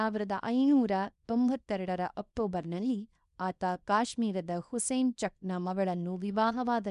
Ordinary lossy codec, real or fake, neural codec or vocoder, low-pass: none; fake; codec, 24 kHz, 0.9 kbps, WavTokenizer, small release; 10.8 kHz